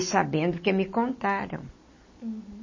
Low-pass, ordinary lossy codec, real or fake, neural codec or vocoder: 7.2 kHz; MP3, 32 kbps; real; none